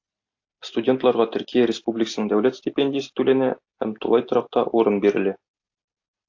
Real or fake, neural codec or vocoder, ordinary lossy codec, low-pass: real; none; AAC, 48 kbps; 7.2 kHz